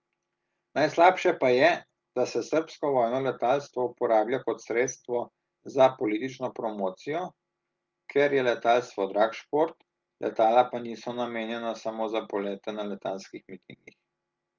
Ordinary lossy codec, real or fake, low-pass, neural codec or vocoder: Opus, 32 kbps; real; 7.2 kHz; none